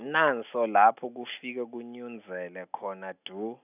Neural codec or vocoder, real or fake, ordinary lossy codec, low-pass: none; real; none; 3.6 kHz